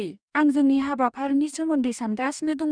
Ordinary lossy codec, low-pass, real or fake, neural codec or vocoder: none; 9.9 kHz; fake; codec, 44.1 kHz, 2.6 kbps, DAC